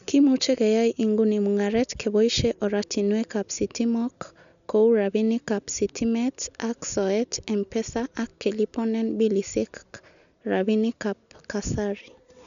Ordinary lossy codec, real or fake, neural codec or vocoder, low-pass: none; real; none; 7.2 kHz